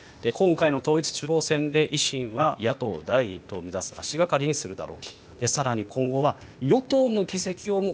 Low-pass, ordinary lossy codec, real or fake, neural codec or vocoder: none; none; fake; codec, 16 kHz, 0.8 kbps, ZipCodec